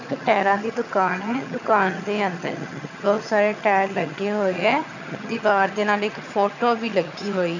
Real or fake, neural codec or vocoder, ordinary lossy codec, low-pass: fake; vocoder, 22.05 kHz, 80 mel bands, HiFi-GAN; none; 7.2 kHz